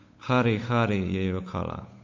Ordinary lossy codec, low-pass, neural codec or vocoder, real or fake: MP3, 48 kbps; 7.2 kHz; codec, 16 kHz, 8 kbps, FunCodec, trained on Chinese and English, 25 frames a second; fake